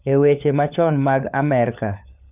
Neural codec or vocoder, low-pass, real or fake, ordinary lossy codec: codec, 16 kHz, 4 kbps, FunCodec, trained on LibriTTS, 50 frames a second; 3.6 kHz; fake; none